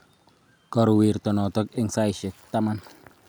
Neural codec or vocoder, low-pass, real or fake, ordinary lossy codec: none; none; real; none